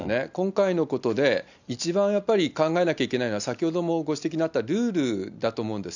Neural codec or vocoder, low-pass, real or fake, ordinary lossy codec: none; 7.2 kHz; real; none